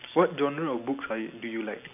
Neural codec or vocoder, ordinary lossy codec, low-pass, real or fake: none; none; 3.6 kHz; real